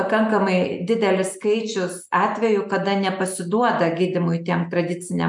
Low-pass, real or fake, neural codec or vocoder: 10.8 kHz; real; none